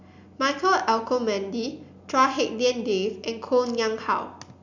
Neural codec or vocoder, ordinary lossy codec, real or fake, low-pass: none; none; real; 7.2 kHz